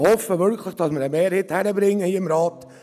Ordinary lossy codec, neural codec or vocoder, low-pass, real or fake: none; none; 14.4 kHz; real